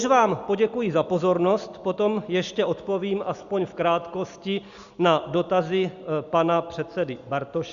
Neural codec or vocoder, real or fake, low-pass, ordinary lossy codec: none; real; 7.2 kHz; Opus, 64 kbps